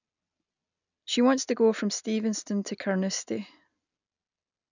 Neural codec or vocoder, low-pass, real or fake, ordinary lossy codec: none; 7.2 kHz; real; none